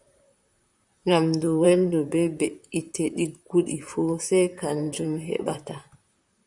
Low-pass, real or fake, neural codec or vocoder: 10.8 kHz; fake; vocoder, 44.1 kHz, 128 mel bands, Pupu-Vocoder